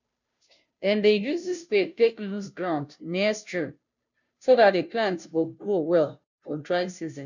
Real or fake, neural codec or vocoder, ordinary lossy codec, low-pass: fake; codec, 16 kHz, 0.5 kbps, FunCodec, trained on Chinese and English, 25 frames a second; none; 7.2 kHz